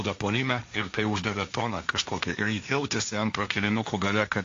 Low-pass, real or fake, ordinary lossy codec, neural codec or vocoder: 7.2 kHz; fake; AAC, 48 kbps; codec, 16 kHz, 1.1 kbps, Voila-Tokenizer